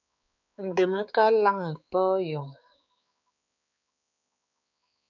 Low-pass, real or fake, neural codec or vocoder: 7.2 kHz; fake; codec, 16 kHz, 4 kbps, X-Codec, HuBERT features, trained on balanced general audio